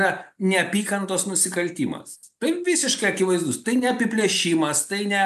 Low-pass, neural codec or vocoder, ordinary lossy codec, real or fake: 14.4 kHz; none; AAC, 96 kbps; real